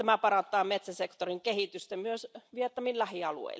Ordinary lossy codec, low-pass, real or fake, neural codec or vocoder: none; none; real; none